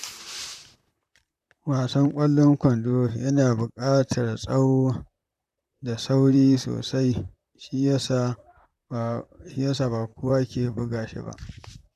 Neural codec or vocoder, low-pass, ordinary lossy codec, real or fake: vocoder, 44.1 kHz, 128 mel bands, Pupu-Vocoder; 14.4 kHz; none; fake